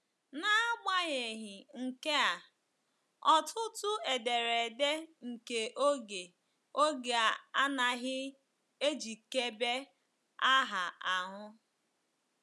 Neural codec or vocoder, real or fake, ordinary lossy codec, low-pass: none; real; none; none